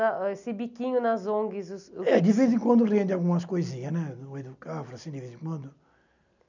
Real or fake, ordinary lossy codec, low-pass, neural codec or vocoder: real; none; 7.2 kHz; none